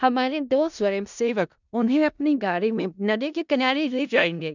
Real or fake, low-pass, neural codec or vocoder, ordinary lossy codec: fake; 7.2 kHz; codec, 16 kHz in and 24 kHz out, 0.4 kbps, LongCat-Audio-Codec, four codebook decoder; none